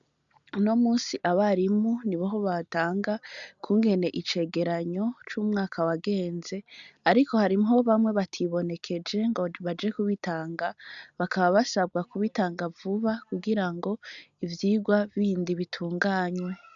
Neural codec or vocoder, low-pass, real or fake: none; 7.2 kHz; real